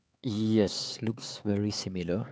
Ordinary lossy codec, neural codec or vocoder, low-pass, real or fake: none; codec, 16 kHz, 4 kbps, X-Codec, HuBERT features, trained on LibriSpeech; none; fake